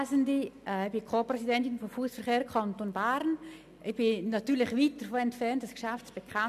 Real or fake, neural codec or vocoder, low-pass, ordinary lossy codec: real; none; 14.4 kHz; none